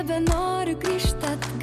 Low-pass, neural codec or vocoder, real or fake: 14.4 kHz; none; real